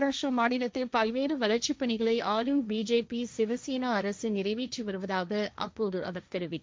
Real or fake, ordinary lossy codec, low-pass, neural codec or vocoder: fake; none; none; codec, 16 kHz, 1.1 kbps, Voila-Tokenizer